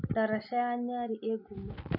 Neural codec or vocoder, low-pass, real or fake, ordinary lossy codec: none; 5.4 kHz; real; none